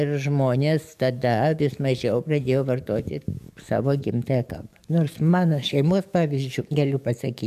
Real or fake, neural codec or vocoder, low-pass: fake; codec, 44.1 kHz, 7.8 kbps, DAC; 14.4 kHz